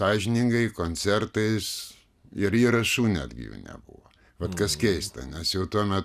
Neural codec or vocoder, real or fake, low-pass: none; real; 14.4 kHz